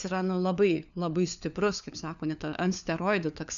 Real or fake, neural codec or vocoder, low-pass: fake; codec, 16 kHz, 4 kbps, FunCodec, trained on LibriTTS, 50 frames a second; 7.2 kHz